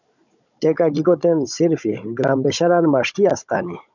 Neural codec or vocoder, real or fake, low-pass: codec, 16 kHz, 16 kbps, FunCodec, trained on Chinese and English, 50 frames a second; fake; 7.2 kHz